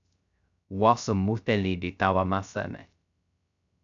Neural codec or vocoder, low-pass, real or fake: codec, 16 kHz, 0.3 kbps, FocalCodec; 7.2 kHz; fake